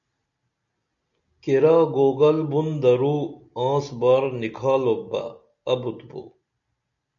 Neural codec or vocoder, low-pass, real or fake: none; 7.2 kHz; real